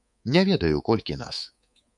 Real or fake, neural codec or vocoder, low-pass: fake; codec, 24 kHz, 3.1 kbps, DualCodec; 10.8 kHz